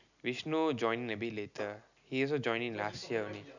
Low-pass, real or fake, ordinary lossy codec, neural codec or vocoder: 7.2 kHz; real; none; none